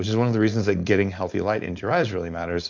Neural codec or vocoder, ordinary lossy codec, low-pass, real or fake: codec, 16 kHz, 4.8 kbps, FACodec; MP3, 64 kbps; 7.2 kHz; fake